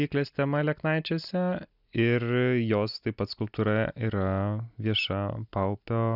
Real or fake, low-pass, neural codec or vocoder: real; 5.4 kHz; none